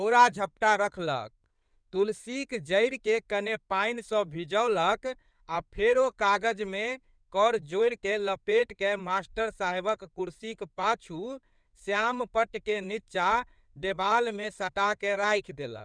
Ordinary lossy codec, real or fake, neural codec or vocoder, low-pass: none; fake; codec, 16 kHz in and 24 kHz out, 2.2 kbps, FireRedTTS-2 codec; 9.9 kHz